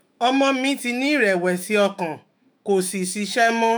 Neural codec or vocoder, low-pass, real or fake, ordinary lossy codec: autoencoder, 48 kHz, 128 numbers a frame, DAC-VAE, trained on Japanese speech; none; fake; none